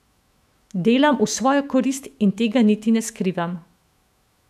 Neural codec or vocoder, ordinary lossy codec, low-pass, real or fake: autoencoder, 48 kHz, 128 numbers a frame, DAC-VAE, trained on Japanese speech; none; 14.4 kHz; fake